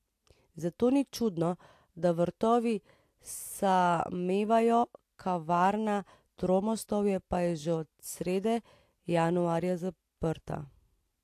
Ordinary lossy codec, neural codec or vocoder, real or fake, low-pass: AAC, 64 kbps; none; real; 14.4 kHz